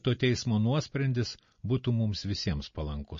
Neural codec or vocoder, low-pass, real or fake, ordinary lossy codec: none; 7.2 kHz; real; MP3, 32 kbps